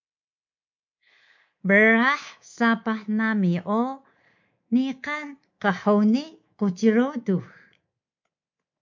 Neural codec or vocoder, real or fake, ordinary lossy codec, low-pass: codec, 24 kHz, 3.1 kbps, DualCodec; fake; MP3, 48 kbps; 7.2 kHz